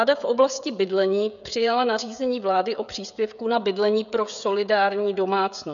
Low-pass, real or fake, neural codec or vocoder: 7.2 kHz; fake; codec, 16 kHz, 16 kbps, FreqCodec, smaller model